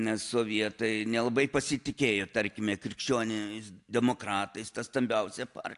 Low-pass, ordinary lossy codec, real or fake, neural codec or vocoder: 10.8 kHz; AAC, 64 kbps; real; none